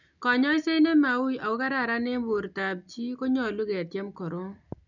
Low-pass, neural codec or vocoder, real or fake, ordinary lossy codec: 7.2 kHz; none; real; none